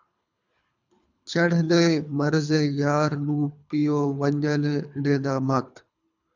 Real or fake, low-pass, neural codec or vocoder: fake; 7.2 kHz; codec, 24 kHz, 3 kbps, HILCodec